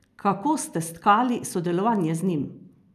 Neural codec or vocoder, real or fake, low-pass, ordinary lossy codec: none; real; 14.4 kHz; none